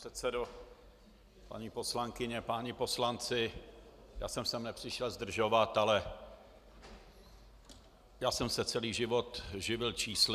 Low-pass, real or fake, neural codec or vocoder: 14.4 kHz; real; none